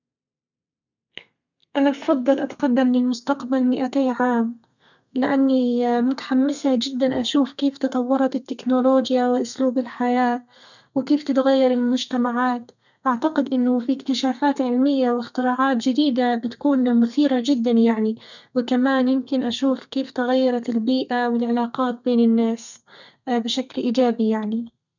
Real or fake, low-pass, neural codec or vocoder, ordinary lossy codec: fake; 7.2 kHz; codec, 32 kHz, 1.9 kbps, SNAC; none